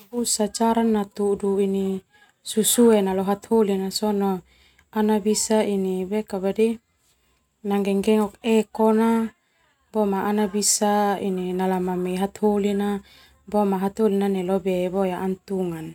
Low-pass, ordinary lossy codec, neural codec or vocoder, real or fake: 19.8 kHz; none; none; real